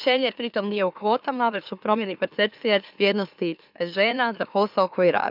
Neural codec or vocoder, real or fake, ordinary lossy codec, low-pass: autoencoder, 44.1 kHz, a latent of 192 numbers a frame, MeloTTS; fake; none; 5.4 kHz